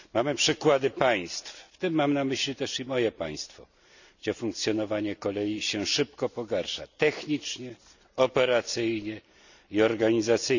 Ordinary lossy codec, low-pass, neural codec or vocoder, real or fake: none; 7.2 kHz; none; real